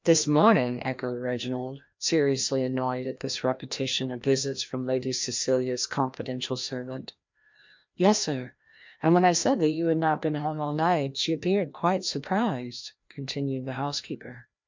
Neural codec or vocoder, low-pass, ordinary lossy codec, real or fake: codec, 16 kHz, 1 kbps, FreqCodec, larger model; 7.2 kHz; MP3, 64 kbps; fake